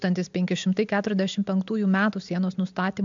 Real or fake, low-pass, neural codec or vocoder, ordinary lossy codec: real; 7.2 kHz; none; MP3, 64 kbps